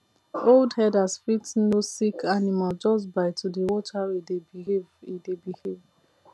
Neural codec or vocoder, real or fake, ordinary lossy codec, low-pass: none; real; none; none